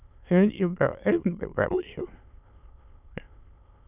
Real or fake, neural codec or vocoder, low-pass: fake; autoencoder, 22.05 kHz, a latent of 192 numbers a frame, VITS, trained on many speakers; 3.6 kHz